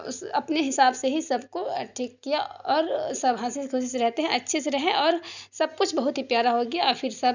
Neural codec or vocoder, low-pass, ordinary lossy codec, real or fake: none; 7.2 kHz; none; real